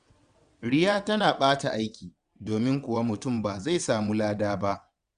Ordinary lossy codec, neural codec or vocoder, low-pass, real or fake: Opus, 64 kbps; vocoder, 22.05 kHz, 80 mel bands, WaveNeXt; 9.9 kHz; fake